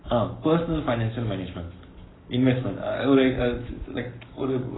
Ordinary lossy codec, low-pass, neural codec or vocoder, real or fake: AAC, 16 kbps; 7.2 kHz; codec, 44.1 kHz, 7.8 kbps, Pupu-Codec; fake